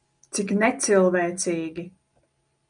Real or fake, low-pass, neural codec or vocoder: real; 9.9 kHz; none